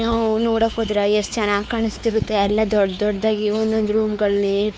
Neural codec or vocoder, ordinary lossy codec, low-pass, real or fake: codec, 16 kHz, 4 kbps, X-Codec, WavLM features, trained on Multilingual LibriSpeech; none; none; fake